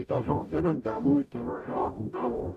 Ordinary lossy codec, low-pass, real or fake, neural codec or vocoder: MP3, 96 kbps; 14.4 kHz; fake; codec, 44.1 kHz, 0.9 kbps, DAC